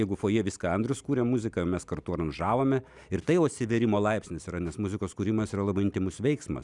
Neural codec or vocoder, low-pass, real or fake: none; 10.8 kHz; real